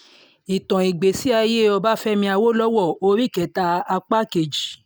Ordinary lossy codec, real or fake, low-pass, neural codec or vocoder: none; real; none; none